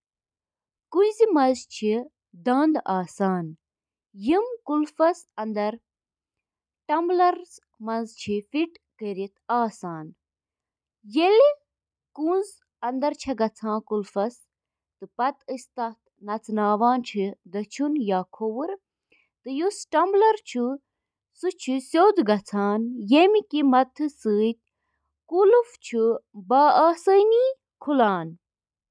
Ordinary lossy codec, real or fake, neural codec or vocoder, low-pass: none; real; none; 9.9 kHz